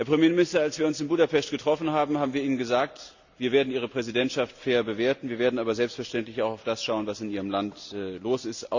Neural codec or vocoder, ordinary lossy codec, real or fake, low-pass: none; Opus, 64 kbps; real; 7.2 kHz